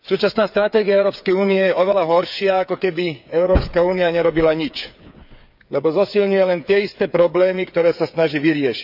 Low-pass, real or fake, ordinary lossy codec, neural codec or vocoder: 5.4 kHz; fake; none; codec, 16 kHz, 8 kbps, FreqCodec, smaller model